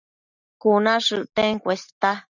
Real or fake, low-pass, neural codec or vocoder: real; 7.2 kHz; none